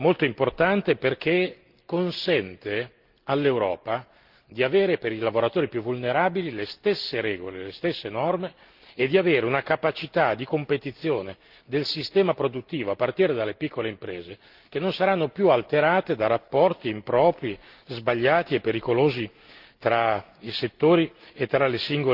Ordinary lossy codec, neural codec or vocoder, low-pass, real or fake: Opus, 32 kbps; none; 5.4 kHz; real